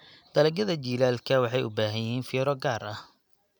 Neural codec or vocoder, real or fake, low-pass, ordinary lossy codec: none; real; 19.8 kHz; none